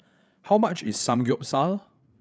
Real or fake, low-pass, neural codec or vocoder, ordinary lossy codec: fake; none; codec, 16 kHz, 8 kbps, FreqCodec, larger model; none